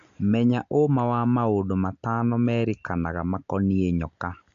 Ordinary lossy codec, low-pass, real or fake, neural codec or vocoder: AAC, 64 kbps; 7.2 kHz; real; none